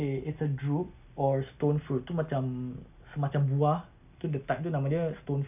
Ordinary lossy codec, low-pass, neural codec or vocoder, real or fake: none; 3.6 kHz; none; real